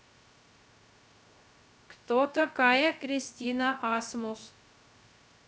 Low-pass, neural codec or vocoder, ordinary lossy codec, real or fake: none; codec, 16 kHz, 0.3 kbps, FocalCodec; none; fake